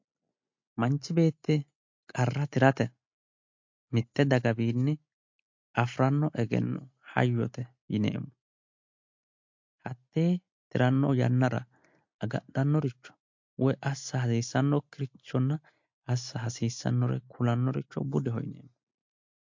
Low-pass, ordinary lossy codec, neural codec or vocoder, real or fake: 7.2 kHz; MP3, 48 kbps; none; real